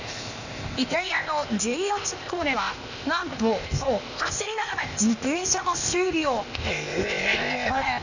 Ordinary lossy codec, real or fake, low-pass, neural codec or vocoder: none; fake; 7.2 kHz; codec, 16 kHz, 0.8 kbps, ZipCodec